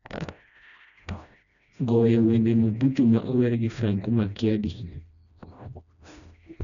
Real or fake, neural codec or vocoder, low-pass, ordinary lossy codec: fake; codec, 16 kHz, 1 kbps, FreqCodec, smaller model; 7.2 kHz; none